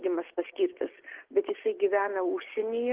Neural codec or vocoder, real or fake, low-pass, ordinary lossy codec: none; real; 3.6 kHz; Opus, 16 kbps